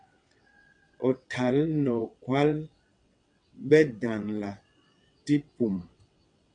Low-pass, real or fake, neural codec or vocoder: 9.9 kHz; fake; vocoder, 22.05 kHz, 80 mel bands, WaveNeXt